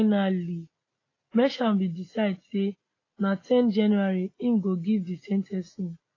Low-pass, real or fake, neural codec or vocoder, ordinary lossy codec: 7.2 kHz; real; none; AAC, 32 kbps